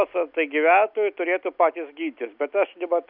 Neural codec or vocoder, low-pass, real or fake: none; 5.4 kHz; real